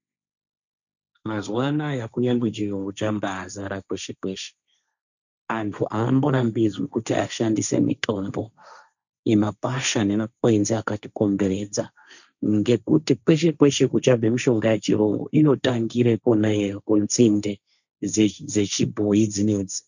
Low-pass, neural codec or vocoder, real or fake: 7.2 kHz; codec, 16 kHz, 1.1 kbps, Voila-Tokenizer; fake